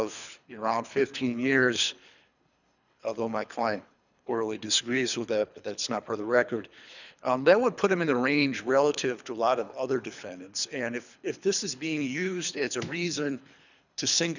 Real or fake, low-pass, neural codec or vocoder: fake; 7.2 kHz; codec, 24 kHz, 3 kbps, HILCodec